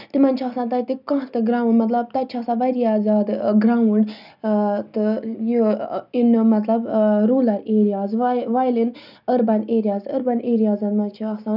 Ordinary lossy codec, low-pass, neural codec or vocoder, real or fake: none; 5.4 kHz; none; real